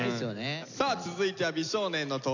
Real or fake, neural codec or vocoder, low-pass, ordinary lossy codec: real; none; 7.2 kHz; none